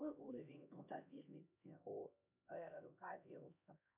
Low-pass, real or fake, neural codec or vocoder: 3.6 kHz; fake; codec, 16 kHz, 1 kbps, X-Codec, HuBERT features, trained on LibriSpeech